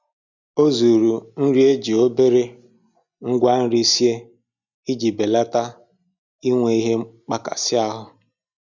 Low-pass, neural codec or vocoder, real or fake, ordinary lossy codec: 7.2 kHz; none; real; none